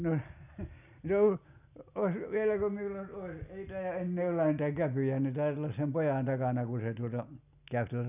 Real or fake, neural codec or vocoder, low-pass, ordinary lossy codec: real; none; 3.6 kHz; none